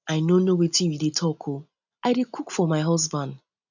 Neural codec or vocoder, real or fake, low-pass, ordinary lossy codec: none; real; 7.2 kHz; none